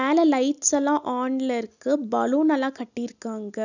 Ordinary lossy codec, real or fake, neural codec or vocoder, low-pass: none; real; none; 7.2 kHz